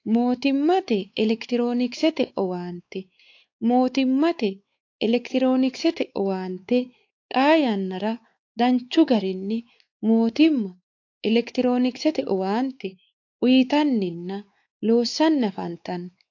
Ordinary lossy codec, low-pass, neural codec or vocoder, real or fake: AAC, 48 kbps; 7.2 kHz; codec, 16 kHz, 4 kbps, X-Codec, WavLM features, trained on Multilingual LibriSpeech; fake